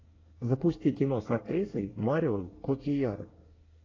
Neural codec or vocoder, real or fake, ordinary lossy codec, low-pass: codec, 24 kHz, 1 kbps, SNAC; fake; AAC, 32 kbps; 7.2 kHz